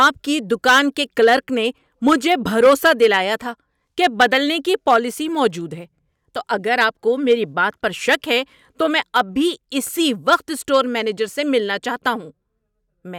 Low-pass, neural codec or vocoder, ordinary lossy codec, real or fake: 19.8 kHz; none; none; real